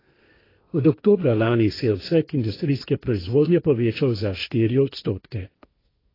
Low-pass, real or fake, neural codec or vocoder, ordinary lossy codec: 5.4 kHz; fake; codec, 16 kHz, 1.1 kbps, Voila-Tokenizer; AAC, 24 kbps